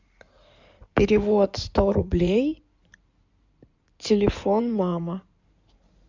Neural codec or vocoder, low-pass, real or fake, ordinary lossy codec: codec, 16 kHz in and 24 kHz out, 2.2 kbps, FireRedTTS-2 codec; 7.2 kHz; fake; MP3, 64 kbps